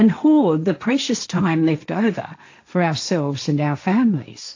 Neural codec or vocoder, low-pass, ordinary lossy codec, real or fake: codec, 16 kHz, 1.1 kbps, Voila-Tokenizer; 7.2 kHz; AAC, 48 kbps; fake